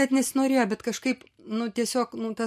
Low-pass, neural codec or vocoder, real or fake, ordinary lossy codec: 14.4 kHz; none; real; MP3, 64 kbps